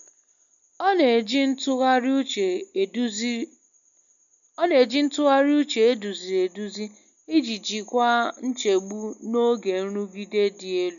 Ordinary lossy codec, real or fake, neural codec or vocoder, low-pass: none; real; none; 7.2 kHz